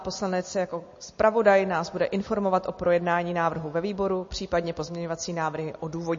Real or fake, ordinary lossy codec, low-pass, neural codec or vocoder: real; MP3, 32 kbps; 7.2 kHz; none